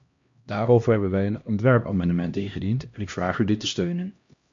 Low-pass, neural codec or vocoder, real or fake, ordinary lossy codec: 7.2 kHz; codec, 16 kHz, 1 kbps, X-Codec, HuBERT features, trained on LibriSpeech; fake; MP3, 48 kbps